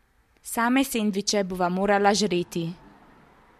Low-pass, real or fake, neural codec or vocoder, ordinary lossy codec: 14.4 kHz; real; none; MP3, 64 kbps